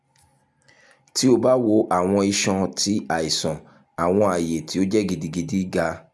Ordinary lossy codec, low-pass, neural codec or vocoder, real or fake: none; none; none; real